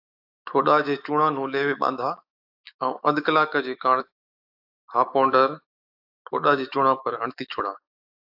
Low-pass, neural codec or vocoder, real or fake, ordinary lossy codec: 5.4 kHz; autoencoder, 48 kHz, 128 numbers a frame, DAC-VAE, trained on Japanese speech; fake; AAC, 48 kbps